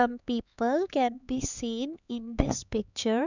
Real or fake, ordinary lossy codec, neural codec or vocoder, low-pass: fake; none; codec, 16 kHz, 4 kbps, X-Codec, HuBERT features, trained on LibriSpeech; 7.2 kHz